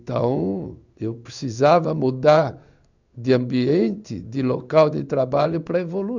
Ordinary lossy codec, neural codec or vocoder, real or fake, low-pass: none; codec, 16 kHz in and 24 kHz out, 1 kbps, XY-Tokenizer; fake; 7.2 kHz